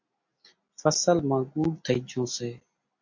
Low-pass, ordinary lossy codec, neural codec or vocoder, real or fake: 7.2 kHz; MP3, 48 kbps; none; real